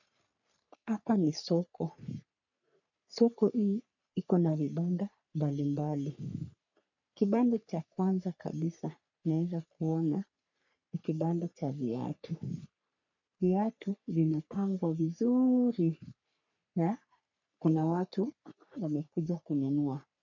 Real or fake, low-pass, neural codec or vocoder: fake; 7.2 kHz; codec, 44.1 kHz, 3.4 kbps, Pupu-Codec